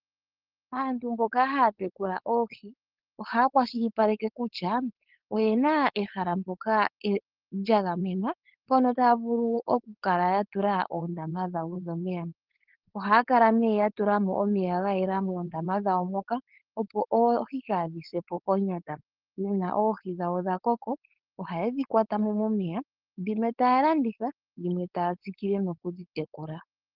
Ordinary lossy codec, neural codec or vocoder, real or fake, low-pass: Opus, 16 kbps; codec, 16 kHz, 4.8 kbps, FACodec; fake; 5.4 kHz